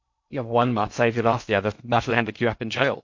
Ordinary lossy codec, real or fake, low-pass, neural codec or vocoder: MP3, 48 kbps; fake; 7.2 kHz; codec, 16 kHz in and 24 kHz out, 0.6 kbps, FocalCodec, streaming, 2048 codes